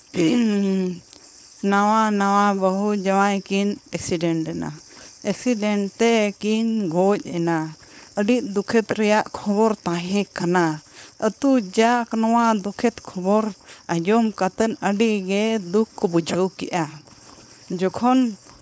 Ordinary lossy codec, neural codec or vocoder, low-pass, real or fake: none; codec, 16 kHz, 4.8 kbps, FACodec; none; fake